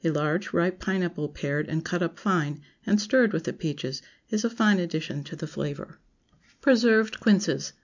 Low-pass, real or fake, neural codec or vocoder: 7.2 kHz; real; none